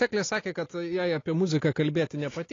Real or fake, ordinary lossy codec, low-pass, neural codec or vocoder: real; AAC, 32 kbps; 7.2 kHz; none